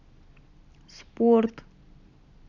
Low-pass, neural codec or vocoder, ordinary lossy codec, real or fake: 7.2 kHz; none; none; real